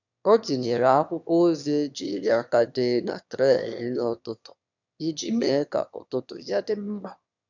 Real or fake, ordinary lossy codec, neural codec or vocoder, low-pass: fake; none; autoencoder, 22.05 kHz, a latent of 192 numbers a frame, VITS, trained on one speaker; 7.2 kHz